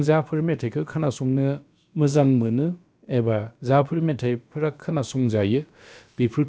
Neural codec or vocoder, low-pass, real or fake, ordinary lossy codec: codec, 16 kHz, about 1 kbps, DyCAST, with the encoder's durations; none; fake; none